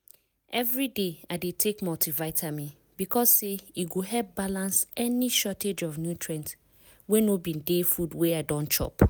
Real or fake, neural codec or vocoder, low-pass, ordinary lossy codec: real; none; none; none